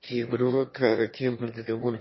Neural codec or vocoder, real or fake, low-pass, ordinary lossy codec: autoencoder, 22.05 kHz, a latent of 192 numbers a frame, VITS, trained on one speaker; fake; 7.2 kHz; MP3, 24 kbps